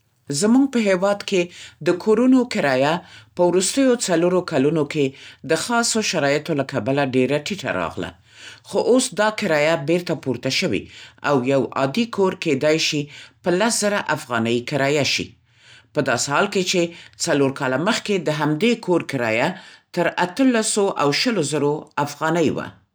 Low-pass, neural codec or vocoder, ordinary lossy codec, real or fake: none; none; none; real